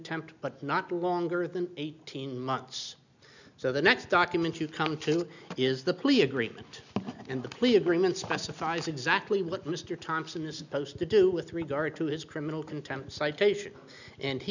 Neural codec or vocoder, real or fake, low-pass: none; real; 7.2 kHz